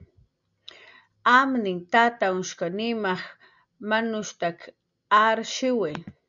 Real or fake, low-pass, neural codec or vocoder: real; 7.2 kHz; none